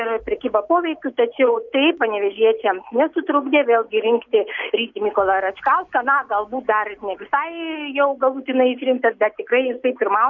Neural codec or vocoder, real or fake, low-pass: none; real; 7.2 kHz